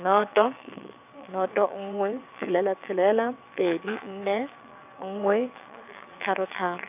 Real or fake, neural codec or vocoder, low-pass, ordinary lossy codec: fake; vocoder, 22.05 kHz, 80 mel bands, WaveNeXt; 3.6 kHz; none